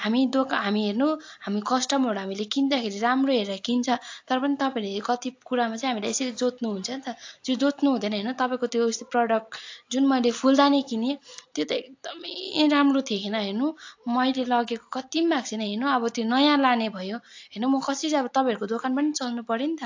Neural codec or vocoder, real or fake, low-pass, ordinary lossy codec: none; real; 7.2 kHz; AAC, 48 kbps